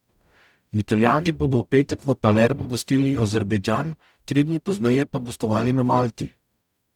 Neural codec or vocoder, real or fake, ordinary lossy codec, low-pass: codec, 44.1 kHz, 0.9 kbps, DAC; fake; none; 19.8 kHz